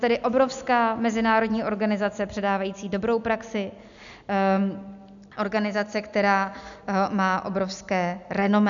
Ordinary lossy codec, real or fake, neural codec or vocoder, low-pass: MP3, 96 kbps; real; none; 7.2 kHz